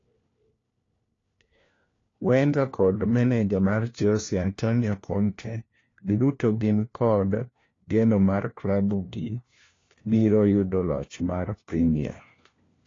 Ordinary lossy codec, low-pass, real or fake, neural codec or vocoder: AAC, 32 kbps; 7.2 kHz; fake; codec, 16 kHz, 1 kbps, FunCodec, trained on LibriTTS, 50 frames a second